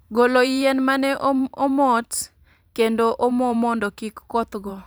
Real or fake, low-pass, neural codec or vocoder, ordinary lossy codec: fake; none; vocoder, 44.1 kHz, 128 mel bands every 512 samples, BigVGAN v2; none